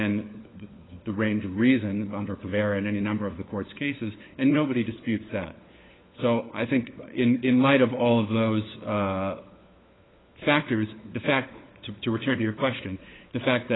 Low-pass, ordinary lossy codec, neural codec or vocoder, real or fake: 7.2 kHz; AAC, 16 kbps; codec, 16 kHz, 4 kbps, FunCodec, trained on LibriTTS, 50 frames a second; fake